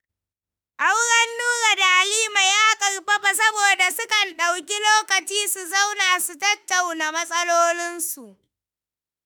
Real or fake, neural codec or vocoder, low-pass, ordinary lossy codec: fake; autoencoder, 48 kHz, 32 numbers a frame, DAC-VAE, trained on Japanese speech; none; none